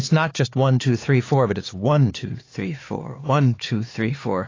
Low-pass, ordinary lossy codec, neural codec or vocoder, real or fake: 7.2 kHz; AAC, 32 kbps; codec, 16 kHz, 4 kbps, X-Codec, HuBERT features, trained on LibriSpeech; fake